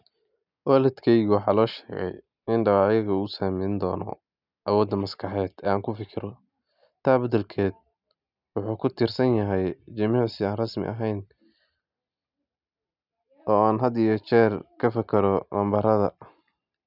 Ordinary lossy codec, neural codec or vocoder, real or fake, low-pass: none; none; real; 5.4 kHz